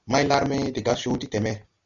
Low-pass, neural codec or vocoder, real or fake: 7.2 kHz; none; real